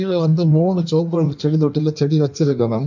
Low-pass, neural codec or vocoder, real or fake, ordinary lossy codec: 7.2 kHz; codec, 16 kHz in and 24 kHz out, 1.1 kbps, FireRedTTS-2 codec; fake; none